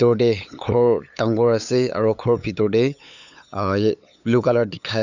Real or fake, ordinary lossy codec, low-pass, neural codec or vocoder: fake; none; 7.2 kHz; codec, 16 kHz, 8 kbps, FunCodec, trained on LibriTTS, 25 frames a second